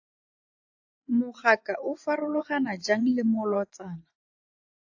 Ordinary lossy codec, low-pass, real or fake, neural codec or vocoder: AAC, 48 kbps; 7.2 kHz; fake; vocoder, 24 kHz, 100 mel bands, Vocos